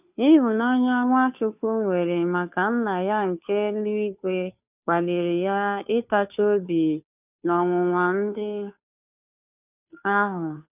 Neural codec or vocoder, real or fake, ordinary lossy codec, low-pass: codec, 16 kHz, 2 kbps, FunCodec, trained on Chinese and English, 25 frames a second; fake; none; 3.6 kHz